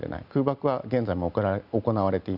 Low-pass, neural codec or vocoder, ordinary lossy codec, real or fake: 5.4 kHz; none; none; real